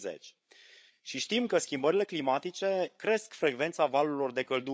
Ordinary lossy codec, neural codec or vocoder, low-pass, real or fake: none; codec, 16 kHz, 8 kbps, FreqCodec, larger model; none; fake